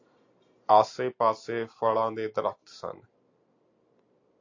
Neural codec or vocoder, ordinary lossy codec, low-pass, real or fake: none; AAC, 48 kbps; 7.2 kHz; real